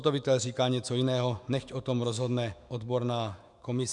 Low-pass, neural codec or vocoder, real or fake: 10.8 kHz; autoencoder, 48 kHz, 128 numbers a frame, DAC-VAE, trained on Japanese speech; fake